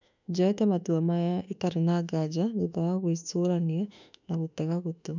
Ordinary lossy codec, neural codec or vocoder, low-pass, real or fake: none; autoencoder, 48 kHz, 32 numbers a frame, DAC-VAE, trained on Japanese speech; 7.2 kHz; fake